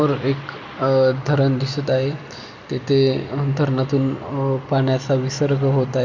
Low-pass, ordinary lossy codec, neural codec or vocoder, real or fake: 7.2 kHz; none; none; real